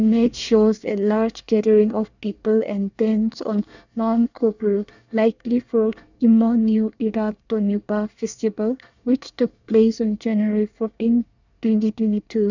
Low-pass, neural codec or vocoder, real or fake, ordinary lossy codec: 7.2 kHz; codec, 24 kHz, 1 kbps, SNAC; fake; none